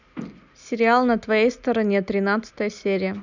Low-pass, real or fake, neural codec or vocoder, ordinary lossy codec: 7.2 kHz; real; none; none